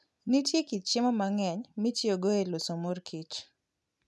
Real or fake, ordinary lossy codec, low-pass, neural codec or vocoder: real; none; none; none